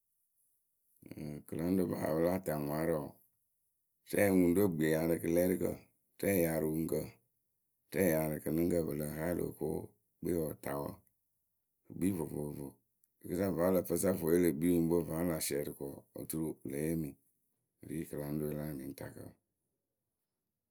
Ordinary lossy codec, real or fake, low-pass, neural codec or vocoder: none; real; none; none